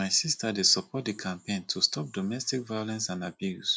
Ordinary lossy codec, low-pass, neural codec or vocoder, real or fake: none; none; none; real